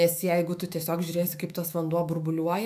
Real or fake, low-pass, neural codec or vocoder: fake; 14.4 kHz; autoencoder, 48 kHz, 128 numbers a frame, DAC-VAE, trained on Japanese speech